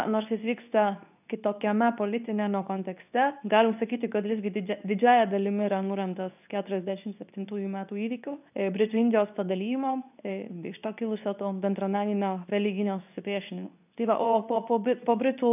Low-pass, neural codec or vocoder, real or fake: 3.6 kHz; codec, 24 kHz, 0.9 kbps, WavTokenizer, medium speech release version 2; fake